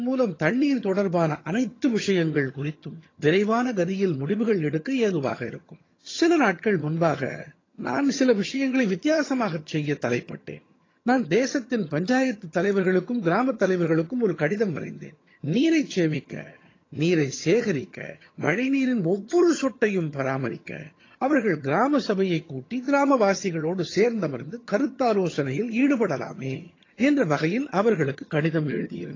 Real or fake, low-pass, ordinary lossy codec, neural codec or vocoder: fake; 7.2 kHz; AAC, 32 kbps; vocoder, 22.05 kHz, 80 mel bands, HiFi-GAN